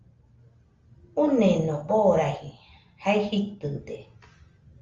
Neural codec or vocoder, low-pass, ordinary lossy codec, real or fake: none; 7.2 kHz; Opus, 32 kbps; real